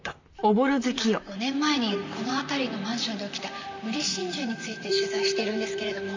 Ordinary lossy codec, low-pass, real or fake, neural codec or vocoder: MP3, 64 kbps; 7.2 kHz; fake; vocoder, 44.1 kHz, 128 mel bands, Pupu-Vocoder